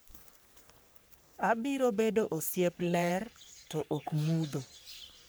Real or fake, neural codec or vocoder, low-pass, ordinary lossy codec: fake; codec, 44.1 kHz, 3.4 kbps, Pupu-Codec; none; none